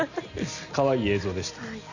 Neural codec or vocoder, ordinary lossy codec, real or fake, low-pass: none; none; real; 7.2 kHz